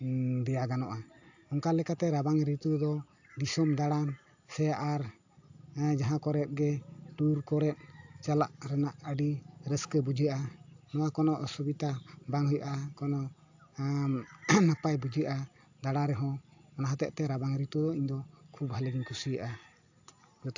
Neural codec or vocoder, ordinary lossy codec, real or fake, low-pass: none; AAC, 48 kbps; real; 7.2 kHz